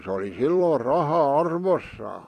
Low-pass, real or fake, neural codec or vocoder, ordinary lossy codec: 14.4 kHz; fake; vocoder, 44.1 kHz, 128 mel bands every 256 samples, BigVGAN v2; AAC, 48 kbps